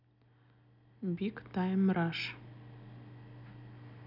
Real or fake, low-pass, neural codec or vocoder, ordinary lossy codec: real; 5.4 kHz; none; none